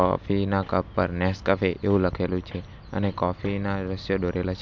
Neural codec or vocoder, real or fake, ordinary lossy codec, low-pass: none; real; MP3, 64 kbps; 7.2 kHz